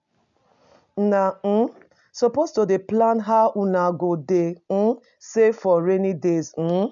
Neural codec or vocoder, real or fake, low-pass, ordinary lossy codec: none; real; 7.2 kHz; none